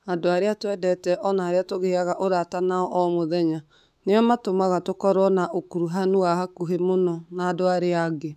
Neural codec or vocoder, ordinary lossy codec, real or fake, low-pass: autoencoder, 48 kHz, 128 numbers a frame, DAC-VAE, trained on Japanese speech; none; fake; 14.4 kHz